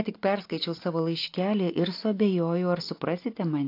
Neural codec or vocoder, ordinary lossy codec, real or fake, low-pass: none; AAC, 32 kbps; real; 5.4 kHz